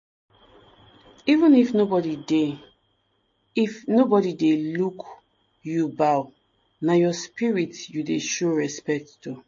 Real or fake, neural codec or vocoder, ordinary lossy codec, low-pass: real; none; MP3, 32 kbps; 7.2 kHz